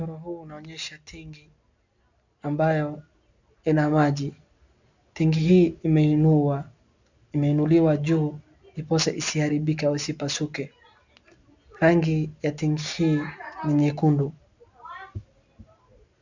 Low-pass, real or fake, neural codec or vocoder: 7.2 kHz; real; none